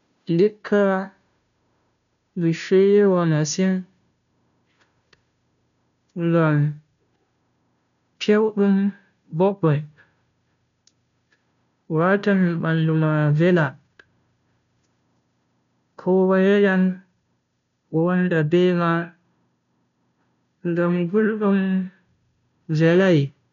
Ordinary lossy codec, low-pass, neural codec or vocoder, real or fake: none; 7.2 kHz; codec, 16 kHz, 0.5 kbps, FunCodec, trained on Chinese and English, 25 frames a second; fake